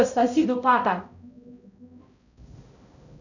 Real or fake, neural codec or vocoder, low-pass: fake; codec, 16 kHz, 0.5 kbps, X-Codec, HuBERT features, trained on balanced general audio; 7.2 kHz